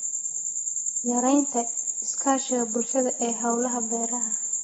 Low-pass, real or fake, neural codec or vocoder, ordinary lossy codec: 19.8 kHz; real; none; AAC, 24 kbps